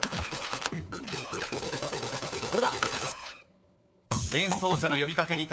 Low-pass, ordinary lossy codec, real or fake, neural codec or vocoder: none; none; fake; codec, 16 kHz, 4 kbps, FunCodec, trained on LibriTTS, 50 frames a second